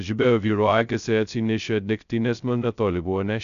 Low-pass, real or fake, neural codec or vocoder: 7.2 kHz; fake; codec, 16 kHz, 0.2 kbps, FocalCodec